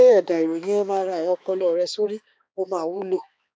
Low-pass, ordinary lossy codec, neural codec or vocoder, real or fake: none; none; codec, 16 kHz, 2 kbps, X-Codec, HuBERT features, trained on balanced general audio; fake